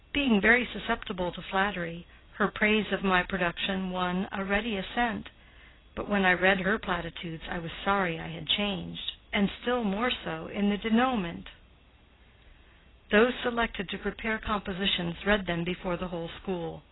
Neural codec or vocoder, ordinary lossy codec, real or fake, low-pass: none; AAC, 16 kbps; real; 7.2 kHz